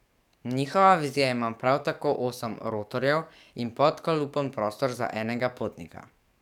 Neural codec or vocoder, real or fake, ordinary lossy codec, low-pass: codec, 44.1 kHz, 7.8 kbps, DAC; fake; none; 19.8 kHz